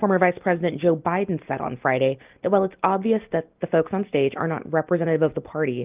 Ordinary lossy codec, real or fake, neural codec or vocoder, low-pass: Opus, 24 kbps; real; none; 3.6 kHz